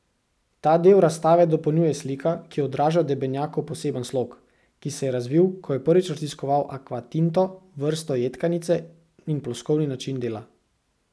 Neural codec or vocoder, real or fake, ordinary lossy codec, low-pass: none; real; none; none